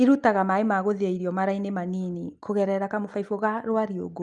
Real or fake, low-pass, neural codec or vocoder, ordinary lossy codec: real; 10.8 kHz; none; Opus, 32 kbps